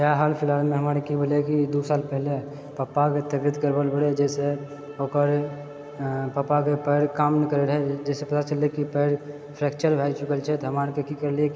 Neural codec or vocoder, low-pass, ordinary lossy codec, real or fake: none; none; none; real